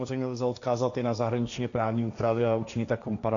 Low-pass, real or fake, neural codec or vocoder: 7.2 kHz; fake; codec, 16 kHz, 1.1 kbps, Voila-Tokenizer